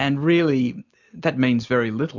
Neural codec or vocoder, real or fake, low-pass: none; real; 7.2 kHz